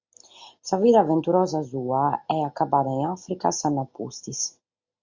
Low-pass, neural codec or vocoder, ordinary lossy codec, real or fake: 7.2 kHz; none; MP3, 48 kbps; real